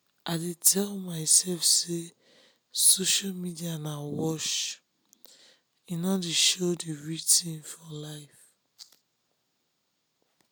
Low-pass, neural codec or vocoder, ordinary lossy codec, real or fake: none; none; none; real